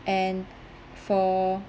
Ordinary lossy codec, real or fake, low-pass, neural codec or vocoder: none; real; none; none